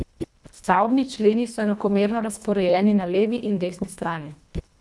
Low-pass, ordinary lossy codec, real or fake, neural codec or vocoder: none; none; fake; codec, 24 kHz, 1.5 kbps, HILCodec